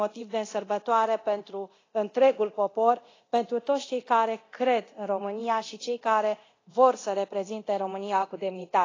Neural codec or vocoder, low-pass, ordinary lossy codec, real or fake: codec, 24 kHz, 0.9 kbps, DualCodec; 7.2 kHz; AAC, 32 kbps; fake